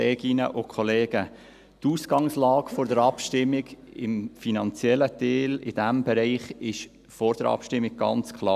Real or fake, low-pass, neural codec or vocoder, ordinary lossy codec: real; 14.4 kHz; none; none